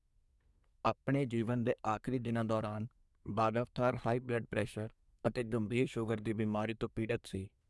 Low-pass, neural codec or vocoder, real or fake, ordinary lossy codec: none; codec, 24 kHz, 1 kbps, SNAC; fake; none